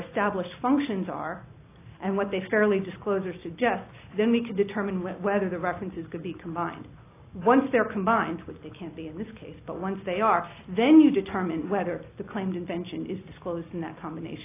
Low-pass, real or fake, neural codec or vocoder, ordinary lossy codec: 3.6 kHz; real; none; AAC, 24 kbps